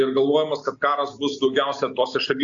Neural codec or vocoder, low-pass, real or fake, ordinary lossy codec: none; 7.2 kHz; real; AAC, 64 kbps